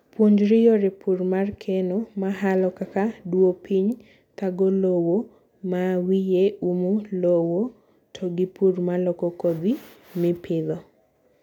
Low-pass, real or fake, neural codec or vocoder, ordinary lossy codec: 19.8 kHz; real; none; none